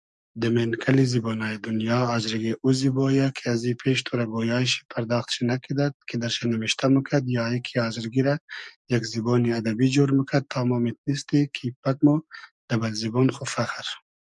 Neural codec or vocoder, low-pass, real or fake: codec, 44.1 kHz, 7.8 kbps, DAC; 10.8 kHz; fake